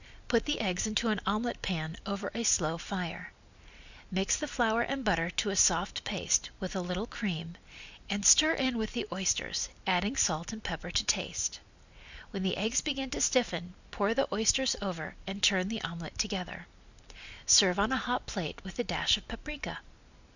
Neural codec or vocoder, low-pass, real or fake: none; 7.2 kHz; real